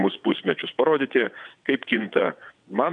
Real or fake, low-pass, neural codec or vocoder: fake; 9.9 kHz; vocoder, 22.05 kHz, 80 mel bands, WaveNeXt